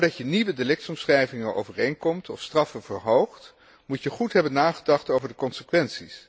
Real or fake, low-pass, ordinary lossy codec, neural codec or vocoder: real; none; none; none